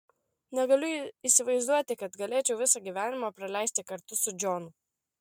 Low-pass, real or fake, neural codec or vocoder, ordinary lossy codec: 19.8 kHz; real; none; MP3, 96 kbps